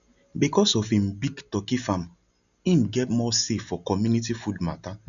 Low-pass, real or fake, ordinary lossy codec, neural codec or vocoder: 7.2 kHz; real; none; none